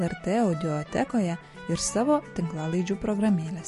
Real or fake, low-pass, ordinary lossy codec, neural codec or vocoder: real; 14.4 kHz; MP3, 48 kbps; none